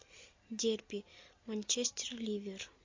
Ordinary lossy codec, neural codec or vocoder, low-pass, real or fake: MP3, 48 kbps; none; 7.2 kHz; real